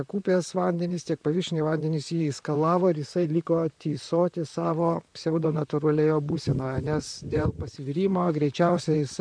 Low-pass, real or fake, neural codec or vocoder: 9.9 kHz; fake; vocoder, 44.1 kHz, 128 mel bands, Pupu-Vocoder